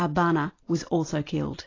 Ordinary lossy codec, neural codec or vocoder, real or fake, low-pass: AAC, 32 kbps; none; real; 7.2 kHz